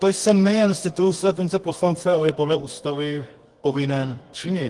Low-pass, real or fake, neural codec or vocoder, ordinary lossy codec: 10.8 kHz; fake; codec, 24 kHz, 0.9 kbps, WavTokenizer, medium music audio release; Opus, 24 kbps